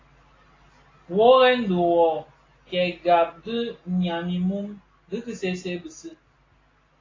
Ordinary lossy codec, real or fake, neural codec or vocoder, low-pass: AAC, 32 kbps; real; none; 7.2 kHz